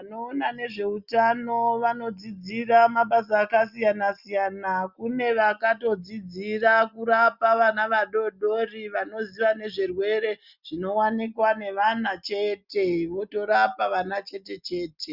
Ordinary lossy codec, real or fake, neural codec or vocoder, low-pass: Opus, 64 kbps; real; none; 5.4 kHz